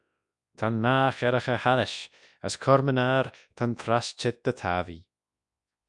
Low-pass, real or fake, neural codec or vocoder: 10.8 kHz; fake; codec, 24 kHz, 0.9 kbps, WavTokenizer, large speech release